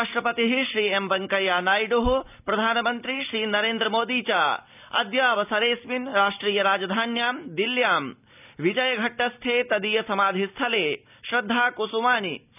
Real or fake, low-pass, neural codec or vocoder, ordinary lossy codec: real; 3.6 kHz; none; none